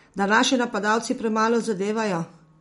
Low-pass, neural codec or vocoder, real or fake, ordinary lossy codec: 19.8 kHz; none; real; MP3, 48 kbps